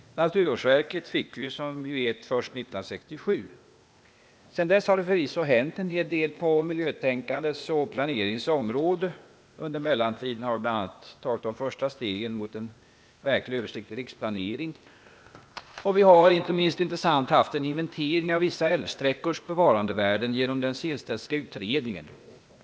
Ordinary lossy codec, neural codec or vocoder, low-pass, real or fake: none; codec, 16 kHz, 0.8 kbps, ZipCodec; none; fake